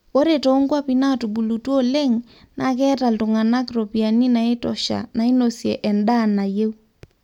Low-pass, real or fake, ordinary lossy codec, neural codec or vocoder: 19.8 kHz; real; none; none